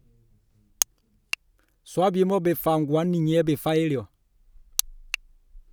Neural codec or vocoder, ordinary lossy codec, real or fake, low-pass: none; none; real; none